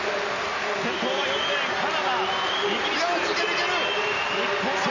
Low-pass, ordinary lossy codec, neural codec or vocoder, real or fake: 7.2 kHz; none; none; real